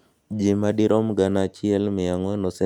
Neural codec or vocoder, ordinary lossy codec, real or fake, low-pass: none; none; real; 19.8 kHz